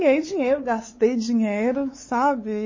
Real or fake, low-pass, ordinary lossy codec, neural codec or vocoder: fake; 7.2 kHz; MP3, 32 kbps; codec, 16 kHz, 4 kbps, X-Codec, WavLM features, trained on Multilingual LibriSpeech